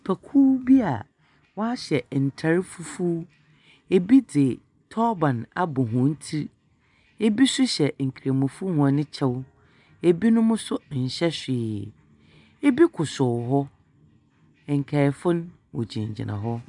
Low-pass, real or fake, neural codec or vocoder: 10.8 kHz; real; none